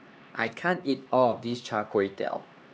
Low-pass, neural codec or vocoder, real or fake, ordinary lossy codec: none; codec, 16 kHz, 2 kbps, X-Codec, HuBERT features, trained on LibriSpeech; fake; none